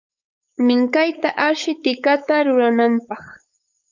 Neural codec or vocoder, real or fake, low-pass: codec, 16 kHz, 4.8 kbps, FACodec; fake; 7.2 kHz